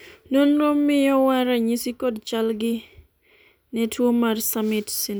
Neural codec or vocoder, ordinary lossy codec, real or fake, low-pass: none; none; real; none